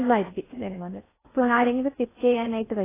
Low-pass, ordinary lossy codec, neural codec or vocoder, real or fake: 3.6 kHz; AAC, 16 kbps; codec, 16 kHz in and 24 kHz out, 0.6 kbps, FocalCodec, streaming, 4096 codes; fake